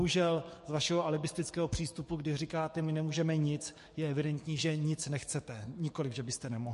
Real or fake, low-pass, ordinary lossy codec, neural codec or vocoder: fake; 14.4 kHz; MP3, 48 kbps; codec, 44.1 kHz, 7.8 kbps, DAC